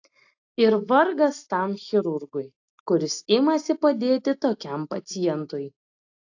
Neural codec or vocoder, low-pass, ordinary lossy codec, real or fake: none; 7.2 kHz; AAC, 48 kbps; real